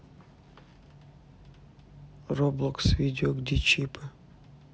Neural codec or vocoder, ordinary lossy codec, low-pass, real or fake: none; none; none; real